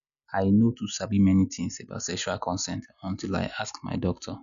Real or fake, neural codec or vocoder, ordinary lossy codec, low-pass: real; none; none; 7.2 kHz